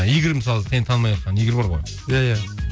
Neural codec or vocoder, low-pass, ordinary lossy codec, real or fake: none; none; none; real